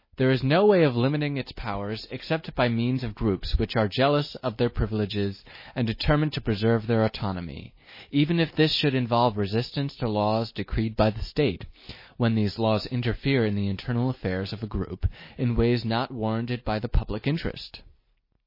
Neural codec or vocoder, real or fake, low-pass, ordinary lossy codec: none; real; 5.4 kHz; MP3, 24 kbps